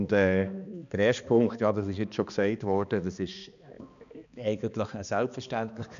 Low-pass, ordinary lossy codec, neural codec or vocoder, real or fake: 7.2 kHz; none; codec, 16 kHz, 2 kbps, X-Codec, HuBERT features, trained on balanced general audio; fake